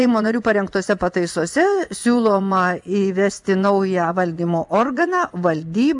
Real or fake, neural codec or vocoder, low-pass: fake; vocoder, 24 kHz, 100 mel bands, Vocos; 10.8 kHz